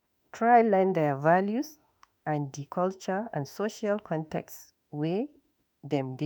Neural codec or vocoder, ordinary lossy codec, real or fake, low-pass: autoencoder, 48 kHz, 32 numbers a frame, DAC-VAE, trained on Japanese speech; none; fake; none